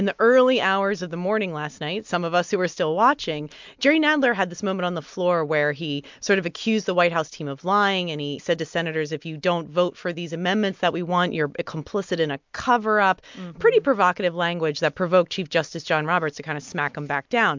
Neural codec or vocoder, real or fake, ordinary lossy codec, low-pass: none; real; MP3, 64 kbps; 7.2 kHz